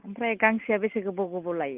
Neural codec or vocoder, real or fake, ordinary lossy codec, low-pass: none; real; Opus, 32 kbps; 3.6 kHz